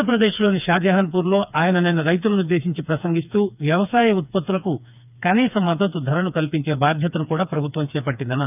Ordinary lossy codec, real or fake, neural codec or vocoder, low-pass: none; fake; codec, 16 kHz, 4 kbps, FreqCodec, smaller model; 3.6 kHz